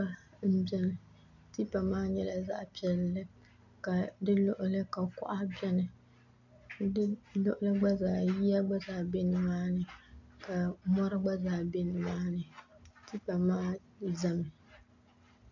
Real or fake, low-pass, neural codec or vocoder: real; 7.2 kHz; none